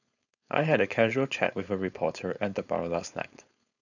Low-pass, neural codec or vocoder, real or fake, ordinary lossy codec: 7.2 kHz; codec, 16 kHz, 4.8 kbps, FACodec; fake; none